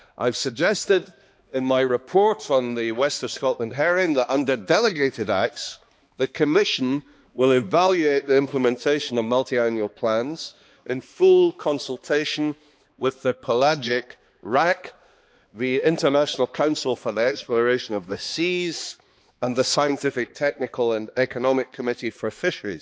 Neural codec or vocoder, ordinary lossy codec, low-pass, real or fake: codec, 16 kHz, 2 kbps, X-Codec, HuBERT features, trained on balanced general audio; none; none; fake